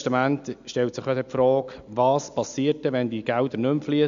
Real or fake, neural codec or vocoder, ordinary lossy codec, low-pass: real; none; MP3, 64 kbps; 7.2 kHz